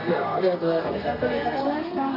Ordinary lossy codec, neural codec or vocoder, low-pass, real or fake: MP3, 32 kbps; codec, 32 kHz, 1.9 kbps, SNAC; 5.4 kHz; fake